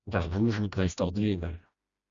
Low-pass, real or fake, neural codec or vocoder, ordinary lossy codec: 7.2 kHz; fake; codec, 16 kHz, 1 kbps, FreqCodec, smaller model; Opus, 64 kbps